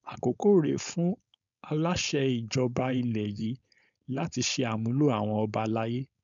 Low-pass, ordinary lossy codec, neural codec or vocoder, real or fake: 7.2 kHz; none; codec, 16 kHz, 4.8 kbps, FACodec; fake